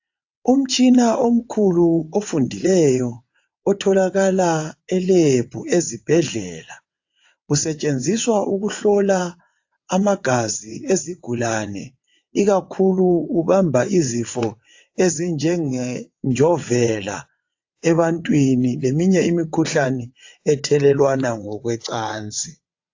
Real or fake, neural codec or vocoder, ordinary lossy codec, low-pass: fake; vocoder, 22.05 kHz, 80 mel bands, WaveNeXt; AAC, 48 kbps; 7.2 kHz